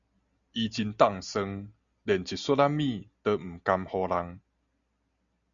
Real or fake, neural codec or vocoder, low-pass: real; none; 7.2 kHz